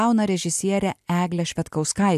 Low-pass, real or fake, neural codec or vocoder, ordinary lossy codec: 14.4 kHz; real; none; MP3, 96 kbps